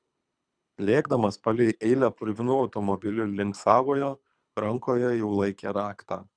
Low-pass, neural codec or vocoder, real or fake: 9.9 kHz; codec, 24 kHz, 3 kbps, HILCodec; fake